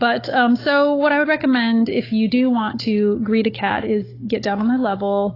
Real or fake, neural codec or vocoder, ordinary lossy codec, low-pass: fake; codec, 16 kHz, 16 kbps, FunCodec, trained on Chinese and English, 50 frames a second; AAC, 24 kbps; 5.4 kHz